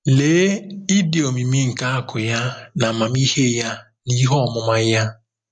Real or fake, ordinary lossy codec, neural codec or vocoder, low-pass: real; MP3, 64 kbps; none; 9.9 kHz